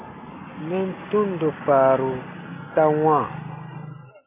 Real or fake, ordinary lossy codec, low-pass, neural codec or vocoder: real; AAC, 24 kbps; 3.6 kHz; none